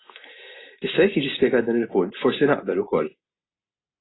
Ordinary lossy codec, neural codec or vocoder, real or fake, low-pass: AAC, 16 kbps; none; real; 7.2 kHz